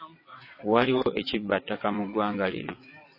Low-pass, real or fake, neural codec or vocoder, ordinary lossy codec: 5.4 kHz; fake; vocoder, 22.05 kHz, 80 mel bands, WaveNeXt; MP3, 24 kbps